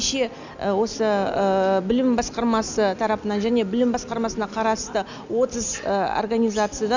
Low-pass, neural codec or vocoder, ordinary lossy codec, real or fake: 7.2 kHz; none; none; real